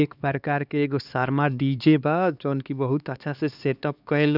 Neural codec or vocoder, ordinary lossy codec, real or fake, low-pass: codec, 16 kHz, 2 kbps, X-Codec, HuBERT features, trained on LibriSpeech; none; fake; 5.4 kHz